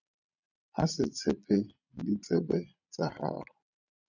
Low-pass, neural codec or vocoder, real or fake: 7.2 kHz; vocoder, 22.05 kHz, 80 mel bands, Vocos; fake